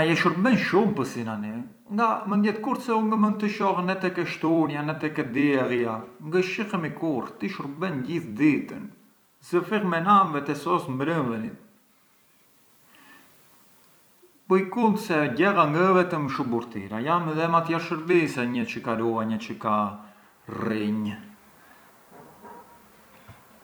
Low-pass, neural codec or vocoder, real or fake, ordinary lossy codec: none; vocoder, 44.1 kHz, 128 mel bands every 256 samples, BigVGAN v2; fake; none